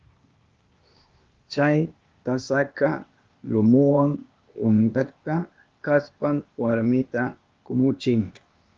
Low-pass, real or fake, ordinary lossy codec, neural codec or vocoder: 7.2 kHz; fake; Opus, 32 kbps; codec, 16 kHz, 0.8 kbps, ZipCodec